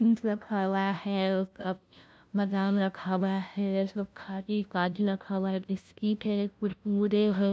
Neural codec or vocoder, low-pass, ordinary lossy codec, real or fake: codec, 16 kHz, 0.5 kbps, FunCodec, trained on LibriTTS, 25 frames a second; none; none; fake